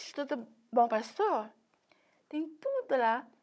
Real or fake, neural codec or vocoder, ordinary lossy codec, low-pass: fake; codec, 16 kHz, 16 kbps, FunCodec, trained on Chinese and English, 50 frames a second; none; none